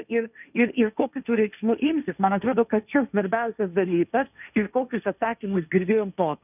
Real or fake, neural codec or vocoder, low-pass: fake; codec, 16 kHz, 1.1 kbps, Voila-Tokenizer; 3.6 kHz